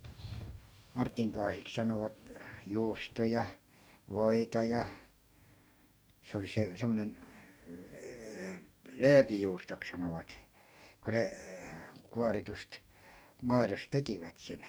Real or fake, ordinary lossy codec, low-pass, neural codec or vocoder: fake; none; none; codec, 44.1 kHz, 2.6 kbps, DAC